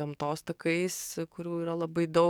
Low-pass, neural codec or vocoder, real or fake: 19.8 kHz; codec, 44.1 kHz, 7.8 kbps, DAC; fake